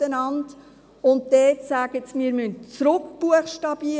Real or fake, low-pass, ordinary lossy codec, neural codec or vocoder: real; none; none; none